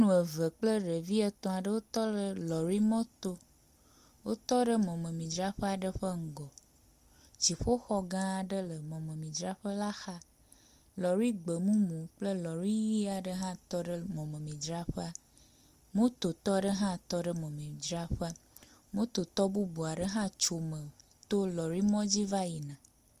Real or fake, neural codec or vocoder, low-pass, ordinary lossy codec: real; none; 14.4 kHz; Opus, 32 kbps